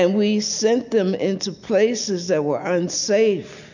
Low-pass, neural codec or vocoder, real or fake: 7.2 kHz; none; real